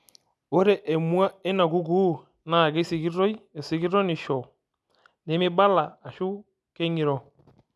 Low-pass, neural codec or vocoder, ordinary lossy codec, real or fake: none; none; none; real